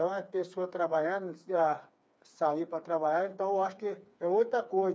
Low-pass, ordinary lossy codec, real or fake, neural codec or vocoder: none; none; fake; codec, 16 kHz, 4 kbps, FreqCodec, smaller model